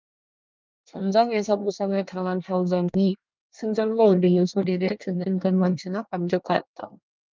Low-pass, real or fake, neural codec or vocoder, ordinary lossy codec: 7.2 kHz; fake; codec, 24 kHz, 1 kbps, SNAC; Opus, 32 kbps